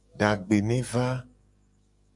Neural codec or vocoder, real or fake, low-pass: codec, 44.1 kHz, 7.8 kbps, DAC; fake; 10.8 kHz